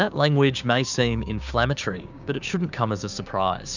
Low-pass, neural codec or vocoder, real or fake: 7.2 kHz; codec, 16 kHz, 6 kbps, DAC; fake